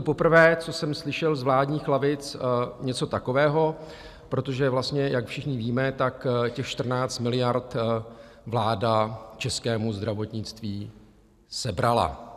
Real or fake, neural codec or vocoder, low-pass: real; none; 14.4 kHz